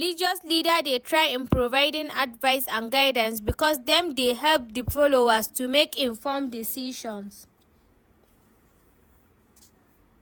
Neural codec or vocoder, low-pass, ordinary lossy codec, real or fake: vocoder, 48 kHz, 128 mel bands, Vocos; none; none; fake